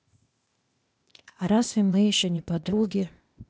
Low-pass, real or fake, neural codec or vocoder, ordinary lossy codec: none; fake; codec, 16 kHz, 0.8 kbps, ZipCodec; none